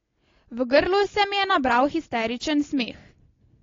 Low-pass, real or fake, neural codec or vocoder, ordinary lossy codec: 7.2 kHz; real; none; AAC, 32 kbps